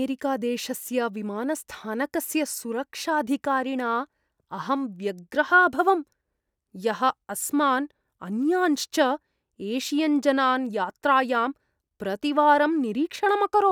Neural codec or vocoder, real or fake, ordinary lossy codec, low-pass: none; real; none; 19.8 kHz